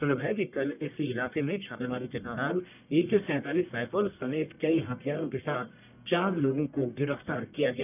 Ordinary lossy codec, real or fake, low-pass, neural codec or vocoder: none; fake; 3.6 kHz; codec, 44.1 kHz, 1.7 kbps, Pupu-Codec